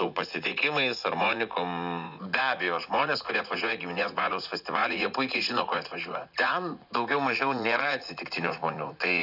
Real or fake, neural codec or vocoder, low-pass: fake; vocoder, 44.1 kHz, 80 mel bands, Vocos; 5.4 kHz